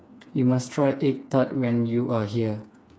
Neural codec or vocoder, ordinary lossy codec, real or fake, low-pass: codec, 16 kHz, 4 kbps, FreqCodec, smaller model; none; fake; none